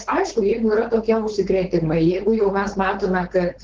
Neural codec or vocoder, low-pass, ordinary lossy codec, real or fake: codec, 16 kHz, 4.8 kbps, FACodec; 7.2 kHz; Opus, 16 kbps; fake